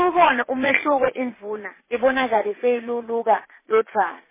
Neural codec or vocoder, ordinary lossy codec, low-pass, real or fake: vocoder, 22.05 kHz, 80 mel bands, Vocos; MP3, 16 kbps; 3.6 kHz; fake